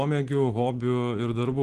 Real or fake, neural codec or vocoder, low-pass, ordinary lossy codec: real; none; 10.8 kHz; Opus, 16 kbps